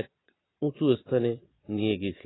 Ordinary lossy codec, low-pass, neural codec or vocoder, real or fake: AAC, 16 kbps; 7.2 kHz; vocoder, 22.05 kHz, 80 mel bands, Vocos; fake